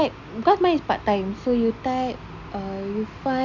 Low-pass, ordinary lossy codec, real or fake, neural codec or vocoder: 7.2 kHz; Opus, 64 kbps; real; none